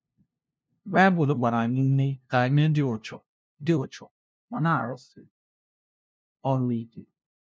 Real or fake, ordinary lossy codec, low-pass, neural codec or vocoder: fake; none; none; codec, 16 kHz, 0.5 kbps, FunCodec, trained on LibriTTS, 25 frames a second